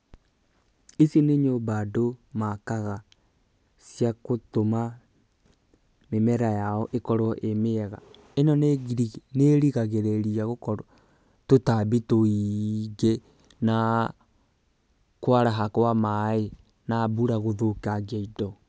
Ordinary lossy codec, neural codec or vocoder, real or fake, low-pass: none; none; real; none